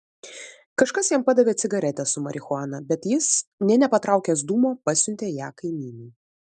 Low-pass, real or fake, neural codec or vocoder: 10.8 kHz; real; none